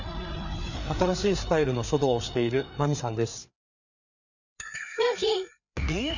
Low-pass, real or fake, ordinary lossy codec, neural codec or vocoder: 7.2 kHz; fake; none; codec, 16 kHz, 4 kbps, FreqCodec, larger model